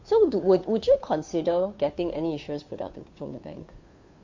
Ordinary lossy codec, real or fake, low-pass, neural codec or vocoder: MP3, 48 kbps; fake; 7.2 kHz; codec, 16 kHz, 2 kbps, FunCodec, trained on Chinese and English, 25 frames a second